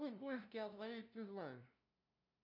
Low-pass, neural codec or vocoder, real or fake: 5.4 kHz; codec, 16 kHz, 0.5 kbps, FunCodec, trained on LibriTTS, 25 frames a second; fake